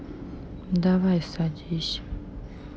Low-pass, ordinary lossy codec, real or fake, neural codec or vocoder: none; none; real; none